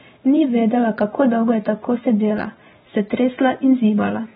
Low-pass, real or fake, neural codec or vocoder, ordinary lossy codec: 19.8 kHz; fake; vocoder, 44.1 kHz, 128 mel bands every 512 samples, BigVGAN v2; AAC, 16 kbps